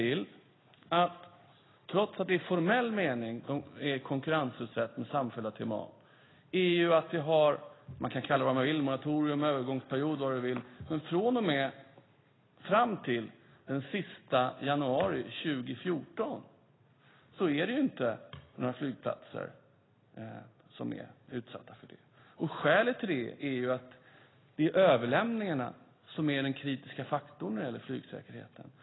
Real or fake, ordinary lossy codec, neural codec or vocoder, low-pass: real; AAC, 16 kbps; none; 7.2 kHz